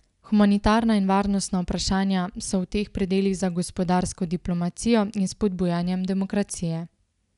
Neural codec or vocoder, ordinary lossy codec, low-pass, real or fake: none; none; 10.8 kHz; real